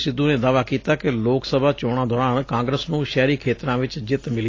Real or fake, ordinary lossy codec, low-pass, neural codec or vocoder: real; AAC, 32 kbps; 7.2 kHz; none